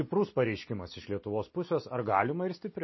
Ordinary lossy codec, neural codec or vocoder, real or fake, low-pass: MP3, 24 kbps; none; real; 7.2 kHz